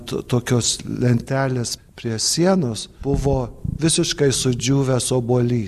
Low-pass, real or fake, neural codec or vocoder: 14.4 kHz; real; none